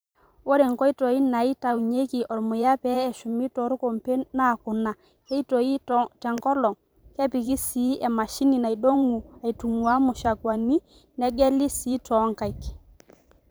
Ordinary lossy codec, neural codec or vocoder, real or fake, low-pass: none; vocoder, 44.1 kHz, 128 mel bands every 512 samples, BigVGAN v2; fake; none